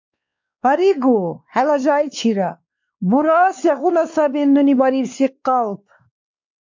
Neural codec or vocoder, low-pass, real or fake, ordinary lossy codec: codec, 16 kHz, 2 kbps, X-Codec, WavLM features, trained on Multilingual LibriSpeech; 7.2 kHz; fake; MP3, 64 kbps